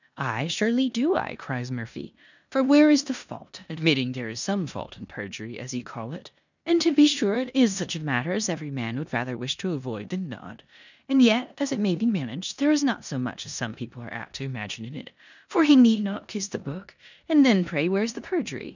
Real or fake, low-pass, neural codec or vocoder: fake; 7.2 kHz; codec, 16 kHz in and 24 kHz out, 0.9 kbps, LongCat-Audio-Codec, four codebook decoder